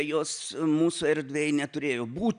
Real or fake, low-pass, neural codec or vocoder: real; 9.9 kHz; none